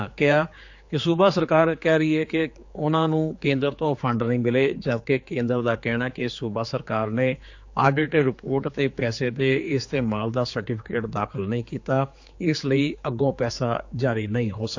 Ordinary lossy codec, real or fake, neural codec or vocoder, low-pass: AAC, 48 kbps; fake; codec, 16 kHz, 4 kbps, X-Codec, HuBERT features, trained on general audio; 7.2 kHz